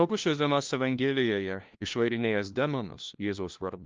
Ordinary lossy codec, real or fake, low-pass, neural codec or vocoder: Opus, 24 kbps; fake; 7.2 kHz; codec, 16 kHz, 1 kbps, FunCodec, trained on LibriTTS, 50 frames a second